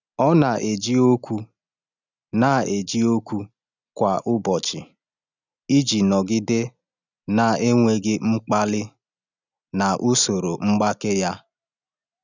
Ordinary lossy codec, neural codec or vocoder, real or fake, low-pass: none; none; real; 7.2 kHz